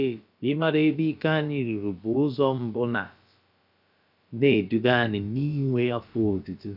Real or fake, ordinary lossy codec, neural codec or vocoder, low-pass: fake; none; codec, 16 kHz, about 1 kbps, DyCAST, with the encoder's durations; 5.4 kHz